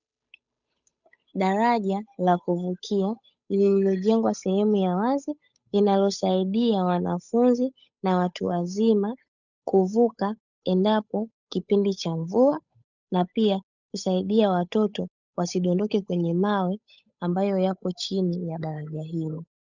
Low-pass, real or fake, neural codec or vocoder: 7.2 kHz; fake; codec, 16 kHz, 8 kbps, FunCodec, trained on Chinese and English, 25 frames a second